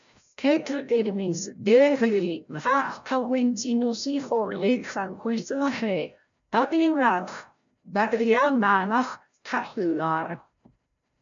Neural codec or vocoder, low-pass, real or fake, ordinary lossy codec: codec, 16 kHz, 0.5 kbps, FreqCodec, larger model; 7.2 kHz; fake; MP3, 64 kbps